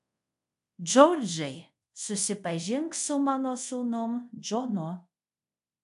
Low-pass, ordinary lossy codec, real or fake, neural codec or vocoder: 10.8 kHz; MP3, 96 kbps; fake; codec, 24 kHz, 0.5 kbps, DualCodec